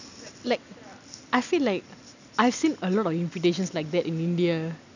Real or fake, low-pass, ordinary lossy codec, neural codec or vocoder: real; 7.2 kHz; none; none